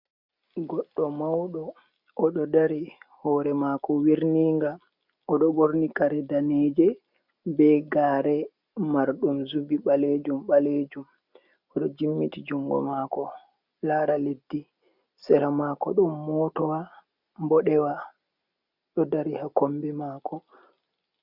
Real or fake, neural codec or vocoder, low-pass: real; none; 5.4 kHz